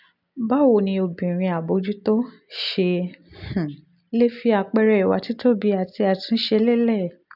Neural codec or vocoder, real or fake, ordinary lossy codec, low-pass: none; real; none; 5.4 kHz